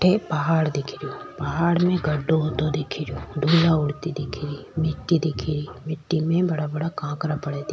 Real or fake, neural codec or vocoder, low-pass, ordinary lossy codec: real; none; none; none